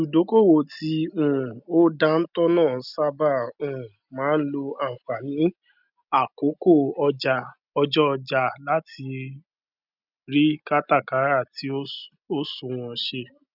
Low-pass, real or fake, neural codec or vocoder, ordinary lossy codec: 5.4 kHz; real; none; none